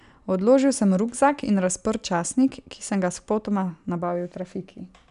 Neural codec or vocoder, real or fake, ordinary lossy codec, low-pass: none; real; none; 10.8 kHz